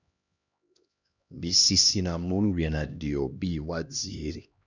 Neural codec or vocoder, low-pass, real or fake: codec, 16 kHz, 1 kbps, X-Codec, HuBERT features, trained on LibriSpeech; 7.2 kHz; fake